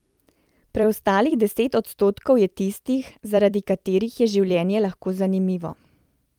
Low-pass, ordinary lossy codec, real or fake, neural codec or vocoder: 19.8 kHz; Opus, 32 kbps; fake; vocoder, 44.1 kHz, 128 mel bands every 256 samples, BigVGAN v2